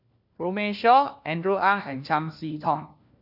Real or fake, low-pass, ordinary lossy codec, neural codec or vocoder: fake; 5.4 kHz; none; codec, 16 kHz, 1 kbps, FunCodec, trained on LibriTTS, 50 frames a second